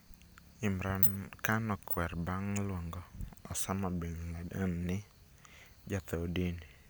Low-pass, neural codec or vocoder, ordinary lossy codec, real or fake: none; none; none; real